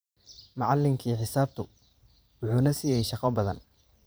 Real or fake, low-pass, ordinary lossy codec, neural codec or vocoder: fake; none; none; vocoder, 44.1 kHz, 128 mel bands every 512 samples, BigVGAN v2